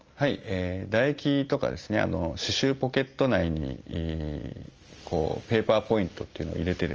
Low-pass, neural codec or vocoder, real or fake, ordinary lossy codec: 7.2 kHz; none; real; Opus, 24 kbps